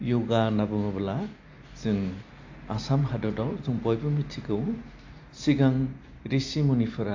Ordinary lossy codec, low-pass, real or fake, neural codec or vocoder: AAC, 48 kbps; 7.2 kHz; real; none